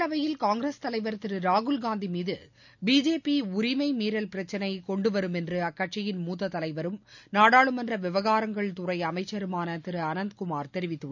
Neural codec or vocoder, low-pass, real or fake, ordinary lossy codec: none; 7.2 kHz; real; none